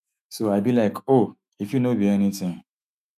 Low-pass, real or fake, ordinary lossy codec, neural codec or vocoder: 14.4 kHz; fake; none; autoencoder, 48 kHz, 128 numbers a frame, DAC-VAE, trained on Japanese speech